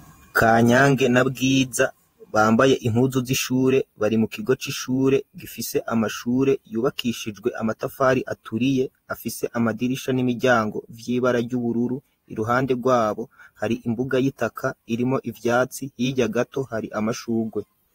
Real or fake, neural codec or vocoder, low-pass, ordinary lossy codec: fake; vocoder, 48 kHz, 128 mel bands, Vocos; 19.8 kHz; AAC, 48 kbps